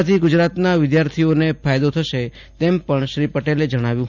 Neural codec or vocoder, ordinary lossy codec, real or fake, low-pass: none; none; real; 7.2 kHz